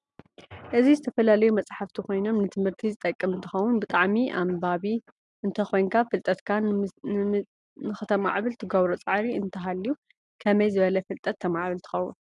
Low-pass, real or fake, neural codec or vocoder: 10.8 kHz; real; none